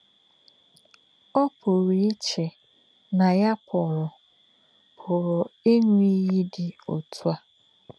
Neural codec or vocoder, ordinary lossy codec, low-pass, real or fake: none; none; none; real